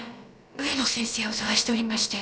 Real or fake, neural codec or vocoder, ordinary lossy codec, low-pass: fake; codec, 16 kHz, about 1 kbps, DyCAST, with the encoder's durations; none; none